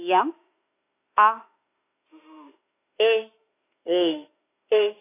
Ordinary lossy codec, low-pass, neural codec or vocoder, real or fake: AAC, 16 kbps; 3.6 kHz; autoencoder, 48 kHz, 32 numbers a frame, DAC-VAE, trained on Japanese speech; fake